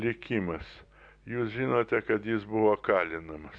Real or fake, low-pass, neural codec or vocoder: real; 9.9 kHz; none